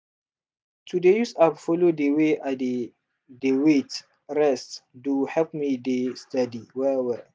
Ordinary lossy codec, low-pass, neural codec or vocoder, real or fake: none; none; none; real